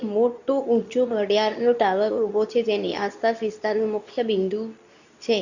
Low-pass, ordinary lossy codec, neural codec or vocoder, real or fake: 7.2 kHz; none; codec, 24 kHz, 0.9 kbps, WavTokenizer, medium speech release version 2; fake